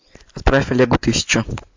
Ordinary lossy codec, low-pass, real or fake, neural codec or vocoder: MP3, 64 kbps; 7.2 kHz; real; none